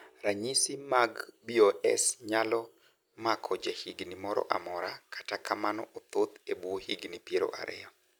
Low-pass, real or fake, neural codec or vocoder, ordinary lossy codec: none; real; none; none